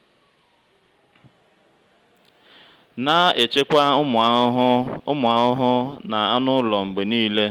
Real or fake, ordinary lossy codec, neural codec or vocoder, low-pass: real; Opus, 24 kbps; none; 19.8 kHz